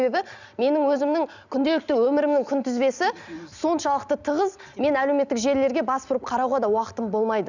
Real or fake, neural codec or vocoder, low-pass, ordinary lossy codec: real; none; 7.2 kHz; none